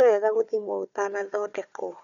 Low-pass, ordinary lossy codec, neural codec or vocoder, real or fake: 7.2 kHz; none; codec, 16 kHz, 4 kbps, FunCodec, trained on Chinese and English, 50 frames a second; fake